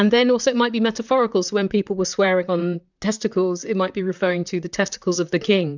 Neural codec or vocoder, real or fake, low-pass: vocoder, 44.1 kHz, 128 mel bands, Pupu-Vocoder; fake; 7.2 kHz